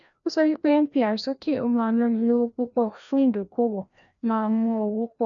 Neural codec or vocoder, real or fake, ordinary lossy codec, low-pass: codec, 16 kHz, 1 kbps, FreqCodec, larger model; fake; none; 7.2 kHz